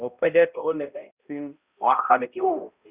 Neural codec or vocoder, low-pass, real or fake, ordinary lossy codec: codec, 16 kHz, 0.5 kbps, X-Codec, HuBERT features, trained on balanced general audio; 3.6 kHz; fake; Opus, 64 kbps